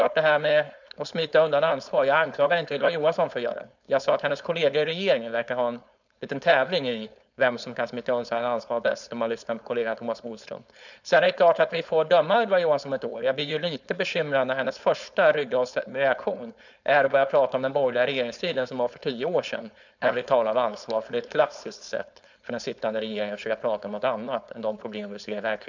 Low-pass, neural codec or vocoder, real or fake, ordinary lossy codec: 7.2 kHz; codec, 16 kHz, 4.8 kbps, FACodec; fake; none